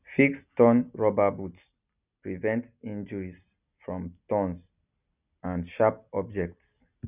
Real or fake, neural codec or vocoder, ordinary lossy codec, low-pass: real; none; none; 3.6 kHz